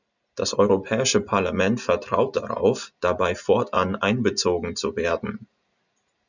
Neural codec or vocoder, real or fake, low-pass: none; real; 7.2 kHz